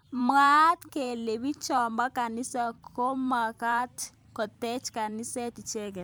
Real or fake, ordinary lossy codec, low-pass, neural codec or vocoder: fake; none; none; vocoder, 44.1 kHz, 128 mel bands every 512 samples, BigVGAN v2